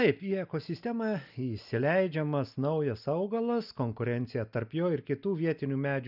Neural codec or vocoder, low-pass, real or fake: none; 5.4 kHz; real